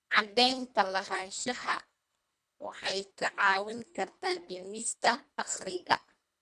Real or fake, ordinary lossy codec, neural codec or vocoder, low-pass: fake; none; codec, 24 kHz, 1.5 kbps, HILCodec; none